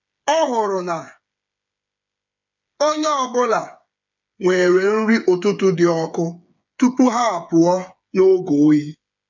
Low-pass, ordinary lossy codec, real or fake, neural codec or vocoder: 7.2 kHz; none; fake; codec, 16 kHz, 8 kbps, FreqCodec, smaller model